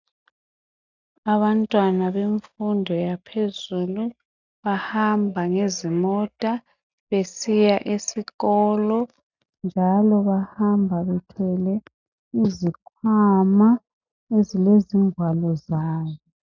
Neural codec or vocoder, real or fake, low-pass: none; real; 7.2 kHz